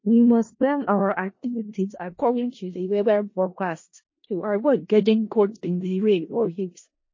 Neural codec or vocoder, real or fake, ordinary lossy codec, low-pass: codec, 16 kHz in and 24 kHz out, 0.4 kbps, LongCat-Audio-Codec, four codebook decoder; fake; MP3, 32 kbps; 7.2 kHz